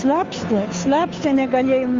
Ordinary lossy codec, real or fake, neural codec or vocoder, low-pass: Opus, 32 kbps; fake; codec, 16 kHz, 2 kbps, FunCodec, trained on Chinese and English, 25 frames a second; 7.2 kHz